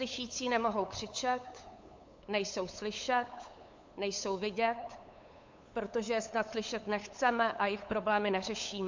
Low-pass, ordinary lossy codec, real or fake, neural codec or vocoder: 7.2 kHz; MP3, 48 kbps; fake; codec, 16 kHz, 8 kbps, FunCodec, trained on LibriTTS, 25 frames a second